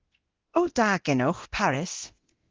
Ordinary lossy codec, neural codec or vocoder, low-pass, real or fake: Opus, 16 kbps; codec, 24 kHz, 0.9 kbps, DualCodec; 7.2 kHz; fake